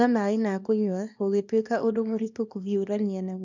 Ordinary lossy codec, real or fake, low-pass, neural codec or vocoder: none; fake; 7.2 kHz; codec, 24 kHz, 0.9 kbps, WavTokenizer, small release